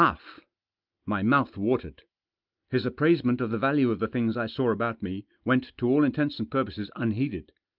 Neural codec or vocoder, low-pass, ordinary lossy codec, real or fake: none; 5.4 kHz; Opus, 24 kbps; real